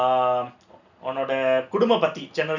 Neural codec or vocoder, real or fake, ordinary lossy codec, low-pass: none; real; none; 7.2 kHz